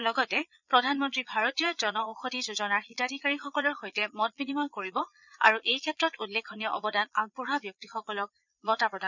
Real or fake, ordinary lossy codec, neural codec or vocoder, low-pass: fake; none; vocoder, 22.05 kHz, 80 mel bands, Vocos; 7.2 kHz